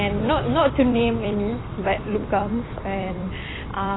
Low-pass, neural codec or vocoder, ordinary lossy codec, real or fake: 7.2 kHz; vocoder, 44.1 kHz, 128 mel bands every 256 samples, BigVGAN v2; AAC, 16 kbps; fake